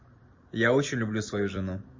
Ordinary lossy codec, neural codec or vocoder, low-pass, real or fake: MP3, 32 kbps; none; 7.2 kHz; real